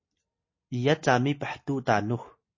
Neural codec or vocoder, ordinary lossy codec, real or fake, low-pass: none; MP3, 32 kbps; real; 7.2 kHz